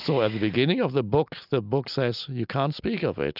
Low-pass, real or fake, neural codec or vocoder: 5.4 kHz; real; none